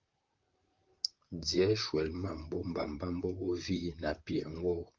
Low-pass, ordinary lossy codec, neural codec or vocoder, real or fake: 7.2 kHz; Opus, 24 kbps; vocoder, 44.1 kHz, 128 mel bands, Pupu-Vocoder; fake